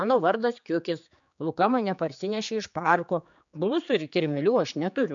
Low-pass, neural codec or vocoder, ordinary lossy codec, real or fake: 7.2 kHz; codec, 16 kHz, 4 kbps, X-Codec, HuBERT features, trained on general audio; MP3, 64 kbps; fake